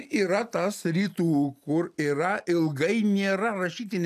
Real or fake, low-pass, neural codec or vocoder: real; 14.4 kHz; none